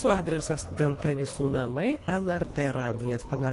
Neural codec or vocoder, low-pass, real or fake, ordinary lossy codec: codec, 24 kHz, 1.5 kbps, HILCodec; 10.8 kHz; fake; AAC, 48 kbps